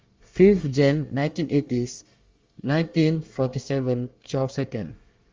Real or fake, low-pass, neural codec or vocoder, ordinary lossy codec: fake; 7.2 kHz; codec, 24 kHz, 1 kbps, SNAC; Opus, 32 kbps